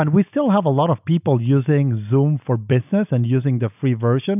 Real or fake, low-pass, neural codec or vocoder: real; 3.6 kHz; none